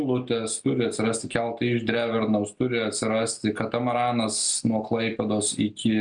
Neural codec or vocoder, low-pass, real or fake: none; 9.9 kHz; real